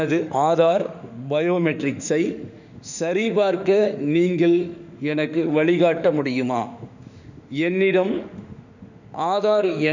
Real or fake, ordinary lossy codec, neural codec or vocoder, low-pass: fake; none; autoencoder, 48 kHz, 32 numbers a frame, DAC-VAE, trained on Japanese speech; 7.2 kHz